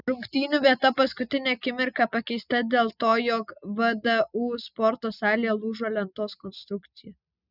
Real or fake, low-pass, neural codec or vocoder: real; 5.4 kHz; none